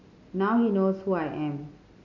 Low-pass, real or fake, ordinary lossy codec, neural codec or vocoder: 7.2 kHz; real; none; none